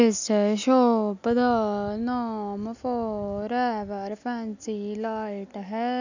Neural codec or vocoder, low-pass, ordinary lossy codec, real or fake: none; 7.2 kHz; none; real